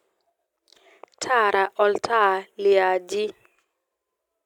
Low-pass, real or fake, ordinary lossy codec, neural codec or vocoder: 19.8 kHz; real; none; none